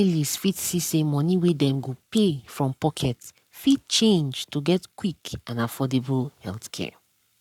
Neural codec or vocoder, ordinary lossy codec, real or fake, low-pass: codec, 44.1 kHz, 7.8 kbps, Pupu-Codec; none; fake; 19.8 kHz